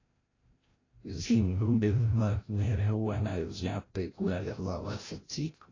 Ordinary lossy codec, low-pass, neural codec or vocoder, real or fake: AAC, 32 kbps; 7.2 kHz; codec, 16 kHz, 0.5 kbps, FreqCodec, larger model; fake